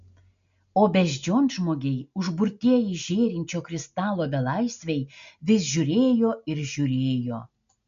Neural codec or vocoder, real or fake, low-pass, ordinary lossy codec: none; real; 7.2 kHz; MP3, 64 kbps